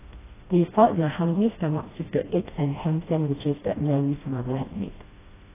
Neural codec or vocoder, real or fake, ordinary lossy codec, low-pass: codec, 16 kHz, 1 kbps, FreqCodec, smaller model; fake; AAC, 16 kbps; 3.6 kHz